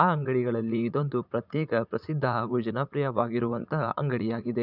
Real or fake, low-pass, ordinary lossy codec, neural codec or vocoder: fake; 5.4 kHz; none; vocoder, 44.1 kHz, 80 mel bands, Vocos